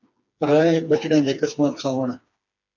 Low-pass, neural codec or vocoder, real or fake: 7.2 kHz; codec, 16 kHz, 4 kbps, FreqCodec, smaller model; fake